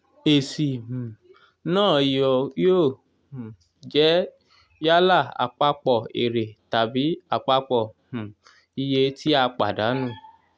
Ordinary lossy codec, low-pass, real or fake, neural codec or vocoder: none; none; real; none